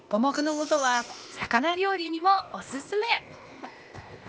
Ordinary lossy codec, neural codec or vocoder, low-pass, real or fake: none; codec, 16 kHz, 0.8 kbps, ZipCodec; none; fake